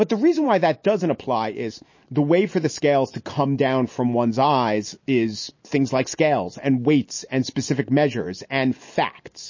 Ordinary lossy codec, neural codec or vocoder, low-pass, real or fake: MP3, 32 kbps; none; 7.2 kHz; real